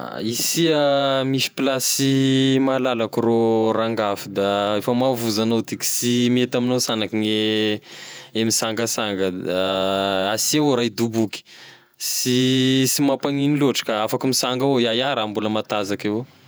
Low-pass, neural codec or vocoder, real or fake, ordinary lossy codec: none; vocoder, 48 kHz, 128 mel bands, Vocos; fake; none